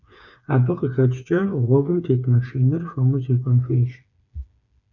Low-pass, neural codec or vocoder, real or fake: 7.2 kHz; codec, 16 kHz, 4 kbps, FreqCodec, smaller model; fake